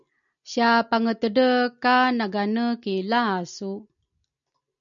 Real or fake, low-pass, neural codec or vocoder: real; 7.2 kHz; none